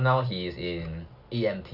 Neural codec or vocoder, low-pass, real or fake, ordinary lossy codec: none; 5.4 kHz; real; none